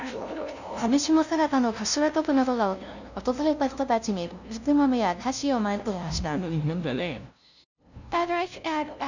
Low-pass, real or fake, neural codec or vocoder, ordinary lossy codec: 7.2 kHz; fake; codec, 16 kHz, 0.5 kbps, FunCodec, trained on LibriTTS, 25 frames a second; none